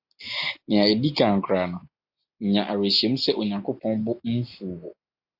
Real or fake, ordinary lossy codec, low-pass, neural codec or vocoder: real; AAC, 48 kbps; 5.4 kHz; none